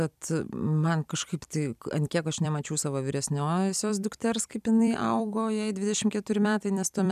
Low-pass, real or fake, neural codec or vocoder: 14.4 kHz; fake; vocoder, 44.1 kHz, 128 mel bands every 256 samples, BigVGAN v2